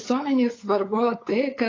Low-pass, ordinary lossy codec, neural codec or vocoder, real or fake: 7.2 kHz; AAC, 32 kbps; codec, 16 kHz, 8 kbps, FunCodec, trained on LibriTTS, 25 frames a second; fake